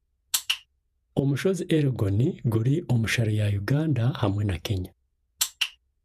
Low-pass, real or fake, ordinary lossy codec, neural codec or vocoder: 14.4 kHz; fake; none; vocoder, 48 kHz, 128 mel bands, Vocos